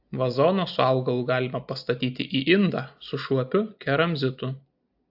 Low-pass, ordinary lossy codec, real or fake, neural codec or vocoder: 5.4 kHz; AAC, 48 kbps; real; none